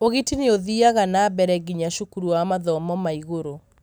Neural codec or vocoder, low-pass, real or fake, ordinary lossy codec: none; none; real; none